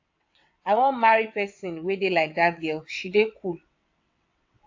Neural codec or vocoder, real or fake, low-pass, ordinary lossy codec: vocoder, 22.05 kHz, 80 mel bands, WaveNeXt; fake; 7.2 kHz; AAC, 48 kbps